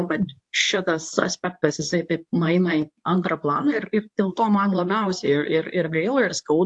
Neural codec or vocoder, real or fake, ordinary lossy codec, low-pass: codec, 24 kHz, 0.9 kbps, WavTokenizer, medium speech release version 2; fake; AAC, 64 kbps; 10.8 kHz